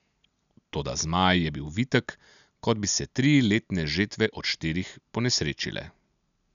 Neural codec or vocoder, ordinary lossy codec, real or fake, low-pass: none; none; real; 7.2 kHz